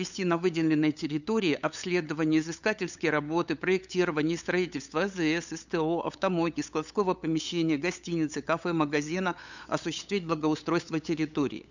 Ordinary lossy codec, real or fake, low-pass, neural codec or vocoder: none; fake; 7.2 kHz; codec, 16 kHz, 8 kbps, FunCodec, trained on LibriTTS, 25 frames a second